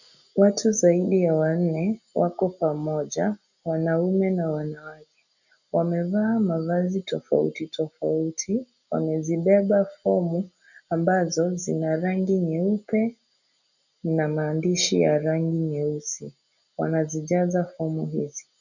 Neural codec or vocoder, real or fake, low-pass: none; real; 7.2 kHz